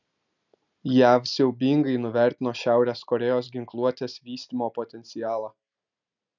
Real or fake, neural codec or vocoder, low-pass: real; none; 7.2 kHz